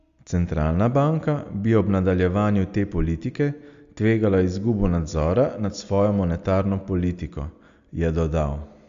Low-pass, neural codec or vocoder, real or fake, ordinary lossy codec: 7.2 kHz; none; real; Opus, 64 kbps